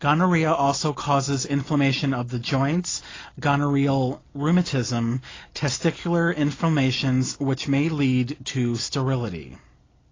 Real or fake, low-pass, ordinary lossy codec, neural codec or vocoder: real; 7.2 kHz; AAC, 32 kbps; none